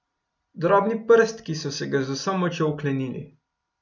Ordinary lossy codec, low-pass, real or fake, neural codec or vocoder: none; 7.2 kHz; real; none